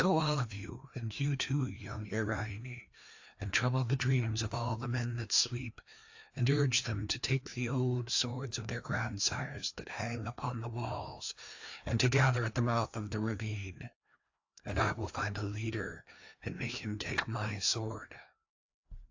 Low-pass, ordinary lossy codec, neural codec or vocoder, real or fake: 7.2 kHz; AAC, 48 kbps; codec, 16 kHz, 2 kbps, FreqCodec, larger model; fake